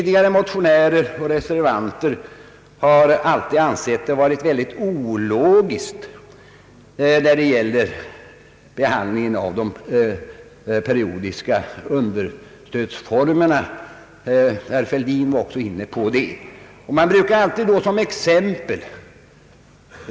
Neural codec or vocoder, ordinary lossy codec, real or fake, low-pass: none; none; real; none